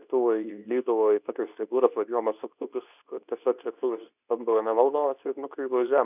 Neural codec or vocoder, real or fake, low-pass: codec, 24 kHz, 0.9 kbps, WavTokenizer, medium speech release version 2; fake; 3.6 kHz